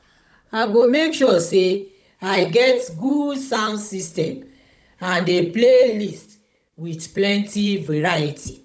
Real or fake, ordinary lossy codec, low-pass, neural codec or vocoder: fake; none; none; codec, 16 kHz, 16 kbps, FunCodec, trained on Chinese and English, 50 frames a second